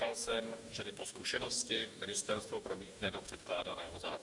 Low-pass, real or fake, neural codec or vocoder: 10.8 kHz; fake; codec, 44.1 kHz, 2.6 kbps, DAC